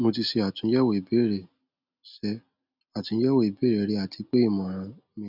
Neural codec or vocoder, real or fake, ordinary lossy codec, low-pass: none; real; none; 5.4 kHz